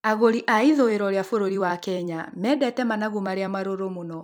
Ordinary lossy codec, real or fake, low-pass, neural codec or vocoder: none; fake; none; vocoder, 44.1 kHz, 128 mel bands every 256 samples, BigVGAN v2